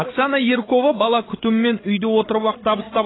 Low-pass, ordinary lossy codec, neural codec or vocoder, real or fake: 7.2 kHz; AAC, 16 kbps; codec, 16 kHz, 16 kbps, FreqCodec, larger model; fake